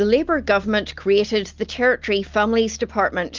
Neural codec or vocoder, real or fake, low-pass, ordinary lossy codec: none; real; 7.2 kHz; Opus, 32 kbps